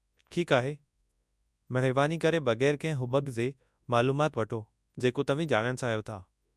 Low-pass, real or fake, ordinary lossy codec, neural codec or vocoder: none; fake; none; codec, 24 kHz, 0.9 kbps, WavTokenizer, large speech release